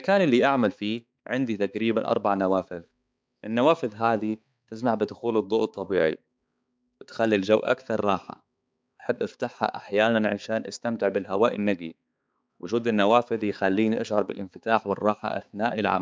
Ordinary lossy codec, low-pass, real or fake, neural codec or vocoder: none; none; fake; codec, 16 kHz, 4 kbps, X-Codec, HuBERT features, trained on balanced general audio